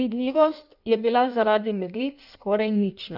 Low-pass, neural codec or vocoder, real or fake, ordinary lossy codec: 5.4 kHz; codec, 16 kHz in and 24 kHz out, 1.1 kbps, FireRedTTS-2 codec; fake; none